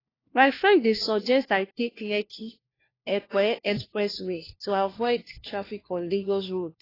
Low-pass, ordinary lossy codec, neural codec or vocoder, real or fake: 5.4 kHz; AAC, 24 kbps; codec, 16 kHz, 1 kbps, FunCodec, trained on LibriTTS, 50 frames a second; fake